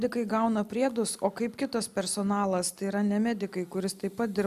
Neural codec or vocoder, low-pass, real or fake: none; 14.4 kHz; real